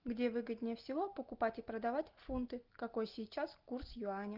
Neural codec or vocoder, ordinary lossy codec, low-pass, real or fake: none; Opus, 32 kbps; 5.4 kHz; real